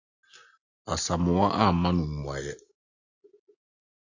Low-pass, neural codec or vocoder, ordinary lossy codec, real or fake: 7.2 kHz; none; AAC, 48 kbps; real